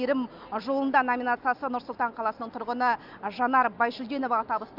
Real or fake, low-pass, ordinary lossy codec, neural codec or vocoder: real; 5.4 kHz; none; none